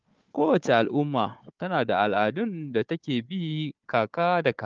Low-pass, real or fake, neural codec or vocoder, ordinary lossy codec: 7.2 kHz; fake; codec, 16 kHz, 4 kbps, FunCodec, trained on Chinese and English, 50 frames a second; Opus, 24 kbps